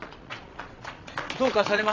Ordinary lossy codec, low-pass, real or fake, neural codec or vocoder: AAC, 48 kbps; 7.2 kHz; fake; vocoder, 22.05 kHz, 80 mel bands, Vocos